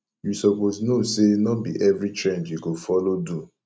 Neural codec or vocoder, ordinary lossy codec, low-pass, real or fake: none; none; none; real